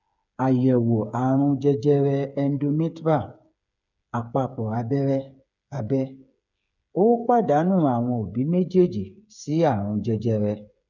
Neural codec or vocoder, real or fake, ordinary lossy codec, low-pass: codec, 16 kHz, 8 kbps, FreqCodec, smaller model; fake; none; 7.2 kHz